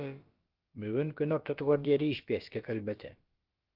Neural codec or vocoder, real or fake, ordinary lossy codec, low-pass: codec, 16 kHz, about 1 kbps, DyCAST, with the encoder's durations; fake; Opus, 32 kbps; 5.4 kHz